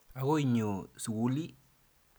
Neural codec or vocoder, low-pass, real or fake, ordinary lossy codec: none; none; real; none